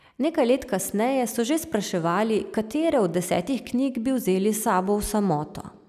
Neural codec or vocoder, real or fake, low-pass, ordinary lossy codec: none; real; 14.4 kHz; none